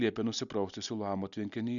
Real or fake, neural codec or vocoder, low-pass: real; none; 7.2 kHz